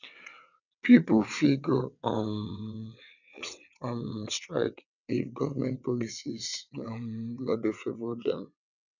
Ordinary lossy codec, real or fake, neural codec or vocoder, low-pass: none; fake; vocoder, 22.05 kHz, 80 mel bands, WaveNeXt; 7.2 kHz